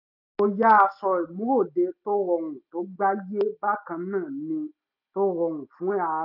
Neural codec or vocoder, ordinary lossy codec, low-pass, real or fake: none; none; 5.4 kHz; real